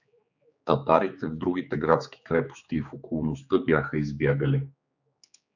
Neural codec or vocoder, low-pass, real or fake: codec, 16 kHz, 4 kbps, X-Codec, HuBERT features, trained on general audio; 7.2 kHz; fake